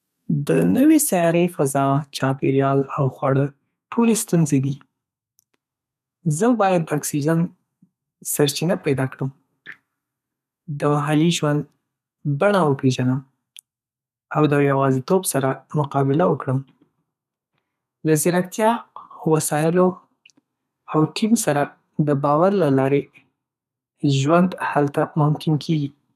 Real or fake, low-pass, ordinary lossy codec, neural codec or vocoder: fake; 14.4 kHz; none; codec, 32 kHz, 1.9 kbps, SNAC